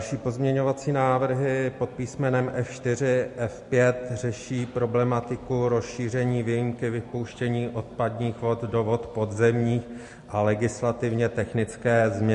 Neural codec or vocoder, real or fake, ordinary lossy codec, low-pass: none; real; MP3, 48 kbps; 14.4 kHz